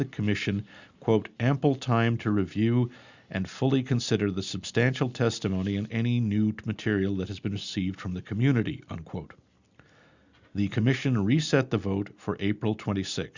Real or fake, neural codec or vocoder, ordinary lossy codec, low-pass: real; none; Opus, 64 kbps; 7.2 kHz